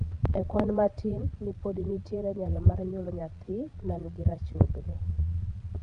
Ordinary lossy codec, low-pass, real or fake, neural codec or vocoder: none; 9.9 kHz; fake; vocoder, 22.05 kHz, 80 mel bands, Vocos